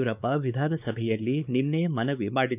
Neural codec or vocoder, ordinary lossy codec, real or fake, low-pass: codec, 16 kHz, 4 kbps, X-Codec, WavLM features, trained on Multilingual LibriSpeech; none; fake; 3.6 kHz